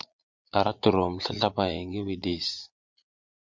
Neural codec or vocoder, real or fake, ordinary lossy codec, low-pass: vocoder, 22.05 kHz, 80 mel bands, Vocos; fake; MP3, 64 kbps; 7.2 kHz